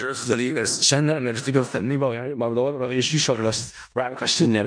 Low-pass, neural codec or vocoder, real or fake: 9.9 kHz; codec, 16 kHz in and 24 kHz out, 0.4 kbps, LongCat-Audio-Codec, four codebook decoder; fake